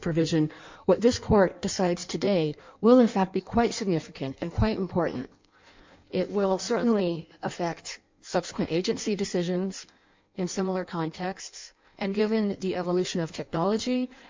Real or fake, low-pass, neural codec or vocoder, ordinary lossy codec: fake; 7.2 kHz; codec, 16 kHz in and 24 kHz out, 1.1 kbps, FireRedTTS-2 codec; MP3, 64 kbps